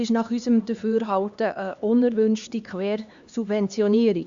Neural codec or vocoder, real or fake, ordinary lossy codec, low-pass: codec, 16 kHz, 2 kbps, X-Codec, HuBERT features, trained on LibriSpeech; fake; Opus, 64 kbps; 7.2 kHz